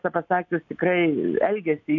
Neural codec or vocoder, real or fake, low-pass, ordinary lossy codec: none; real; 7.2 kHz; AAC, 48 kbps